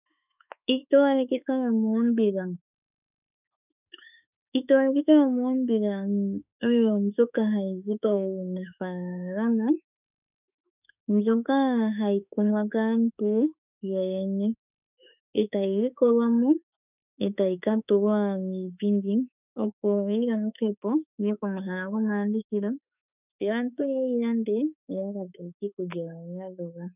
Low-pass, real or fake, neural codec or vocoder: 3.6 kHz; fake; autoencoder, 48 kHz, 32 numbers a frame, DAC-VAE, trained on Japanese speech